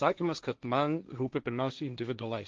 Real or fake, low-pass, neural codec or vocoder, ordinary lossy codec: fake; 7.2 kHz; codec, 16 kHz, 1.1 kbps, Voila-Tokenizer; Opus, 24 kbps